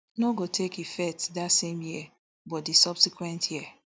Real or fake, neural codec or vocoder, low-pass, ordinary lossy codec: real; none; none; none